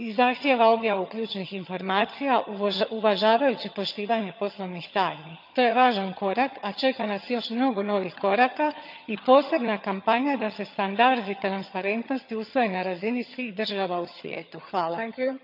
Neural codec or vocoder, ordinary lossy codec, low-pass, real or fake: vocoder, 22.05 kHz, 80 mel bands, HiFi-GAN; none; 5.4 kHz; fake